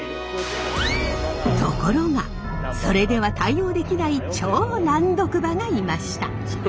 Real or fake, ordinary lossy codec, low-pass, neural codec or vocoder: real; none; none; none